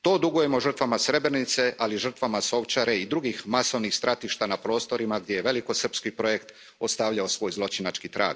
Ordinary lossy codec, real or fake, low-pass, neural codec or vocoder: none; real; none; none